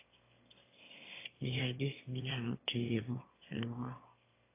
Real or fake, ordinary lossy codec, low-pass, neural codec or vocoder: fake; AAC, 24 kbps; 3.6 kHz; autoencoder, 22.05 kHz, a latent of 192 numbers a frame, VITS, trained on one speaker